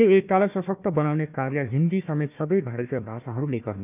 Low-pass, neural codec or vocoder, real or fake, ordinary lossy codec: 3.6 kHz; codec, 16 kHz, 1 kbps, FunCodec, trained on Chinese and English, 50 frames a second; fake; none